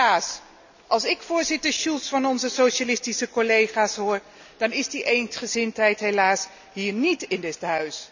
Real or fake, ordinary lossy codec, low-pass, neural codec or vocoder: real; none; 7.2 kHz; none